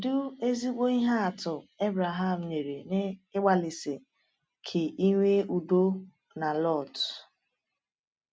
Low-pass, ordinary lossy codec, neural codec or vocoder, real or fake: none; none; none; real